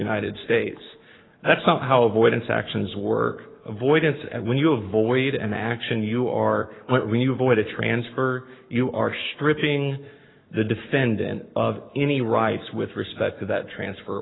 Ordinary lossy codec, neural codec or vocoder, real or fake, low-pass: AAC, 16 kbps; codec, 44.1 kHz, 7.8 kbps, Pupu-Codec; fake; 7.2 kHz